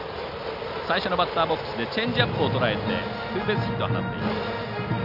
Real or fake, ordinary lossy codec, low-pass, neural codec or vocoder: real; none; 5.4 kHz; none